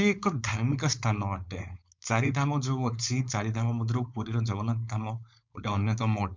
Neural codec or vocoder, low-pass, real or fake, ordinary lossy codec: codec, 16 kHz, 4.8 kbps, FACodec; 7.2 kHz; fake; MP3, 64 kbps